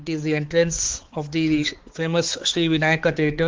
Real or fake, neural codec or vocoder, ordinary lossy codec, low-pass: fake; codec, 16 kHz, 4 kbps, X-Codec, HuBERT features, trained on balanced general audio; Opus, 16 kbps; 7.2 kHz